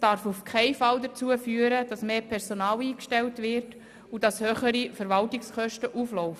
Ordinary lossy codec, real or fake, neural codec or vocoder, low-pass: none; real; none; 14.4 kHz